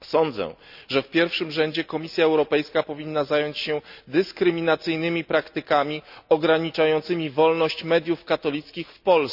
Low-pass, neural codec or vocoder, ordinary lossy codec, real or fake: 5.4 kHz; none; none; real